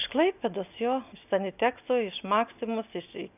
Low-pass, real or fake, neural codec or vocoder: 3.6 kHz; real; none